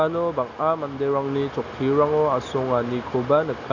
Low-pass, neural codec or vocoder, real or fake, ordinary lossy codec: 7.2 kHz; none; real; none